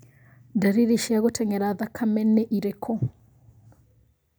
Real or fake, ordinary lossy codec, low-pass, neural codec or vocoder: real; none; none; none